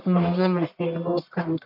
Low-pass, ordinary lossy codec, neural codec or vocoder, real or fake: 5.4 kHz; none; codec, 44.1 kHz, 1.7 kbps, Pupu-Codec; fake